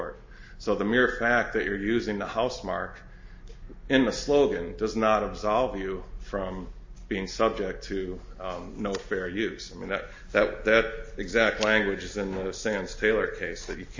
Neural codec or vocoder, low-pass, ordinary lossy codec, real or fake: none; 7.2 kHz; MP3, 32 kbps; real